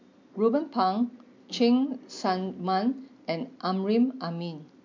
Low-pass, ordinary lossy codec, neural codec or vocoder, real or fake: 7.2 kHz; MP3, 48 kbps; none; real